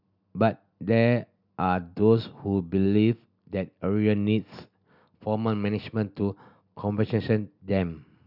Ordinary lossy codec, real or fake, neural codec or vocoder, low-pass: none; real; none; 5.4 kHz